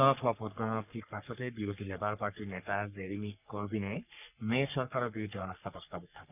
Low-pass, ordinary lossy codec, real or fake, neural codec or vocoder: 3.6 kHz; none; fake; codec, 44.1 kHz, 3.4 kbps, Pupu-Codec